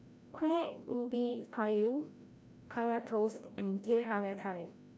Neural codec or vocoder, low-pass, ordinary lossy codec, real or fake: codec, 16 kHz, 0.5 kbps, FreqCodec, larger model; none; none; fake